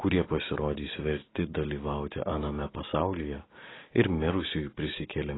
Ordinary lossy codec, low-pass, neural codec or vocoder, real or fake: AAC, 16 kbps; 7.2 kHz; none; real